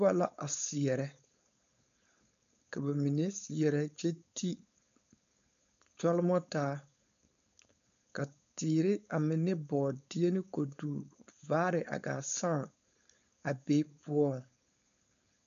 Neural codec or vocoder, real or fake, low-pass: codec, 16 kHz, 4.8 kbps, FACodec; fake; 7.2 kHz